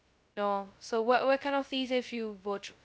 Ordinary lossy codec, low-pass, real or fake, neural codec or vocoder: none; none; fake; codec, 16 kHz, 0.2 kbps, FocalCodec